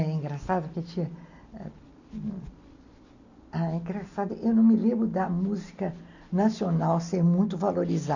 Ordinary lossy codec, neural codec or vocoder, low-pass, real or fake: none; none; 7.2 kHz; real